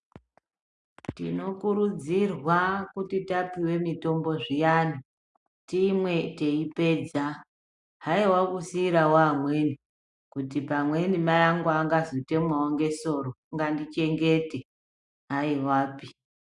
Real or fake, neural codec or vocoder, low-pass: real; none; 10.8 kHz